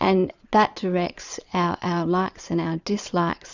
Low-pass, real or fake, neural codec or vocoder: 7.2 kHz; fake; vocoder, 22.05 kHz, 80 mel bands, Vocos